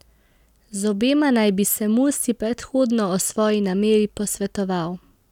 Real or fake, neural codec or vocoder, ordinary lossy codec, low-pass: real; none; none; 19.8 kHz